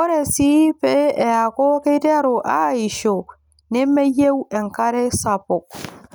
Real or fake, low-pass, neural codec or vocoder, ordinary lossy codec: real; none; none; none